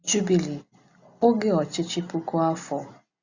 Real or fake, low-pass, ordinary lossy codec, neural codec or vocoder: real; 7.2 kHz; Opus, 64 kbps; none